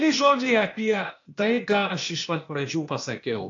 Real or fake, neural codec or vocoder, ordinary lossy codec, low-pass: fake; codec, 16 kHz, 0.8 kbps, ZipCodec; AAC, 48 kbps; 7.2 kHz